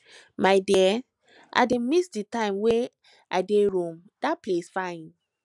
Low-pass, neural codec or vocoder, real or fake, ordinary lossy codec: 10.8 kHz; none; real; none